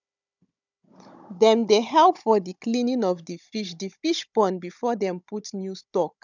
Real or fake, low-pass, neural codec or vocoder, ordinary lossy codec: fake; 7.2 kHz; codec, 16 kHz, 16 kbps, FunCodec, trained on Chinese and English, 50 frames a second; none